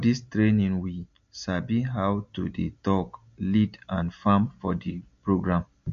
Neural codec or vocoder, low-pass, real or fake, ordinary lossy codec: none; 7.2 kHz; real; MP3, 48 kbps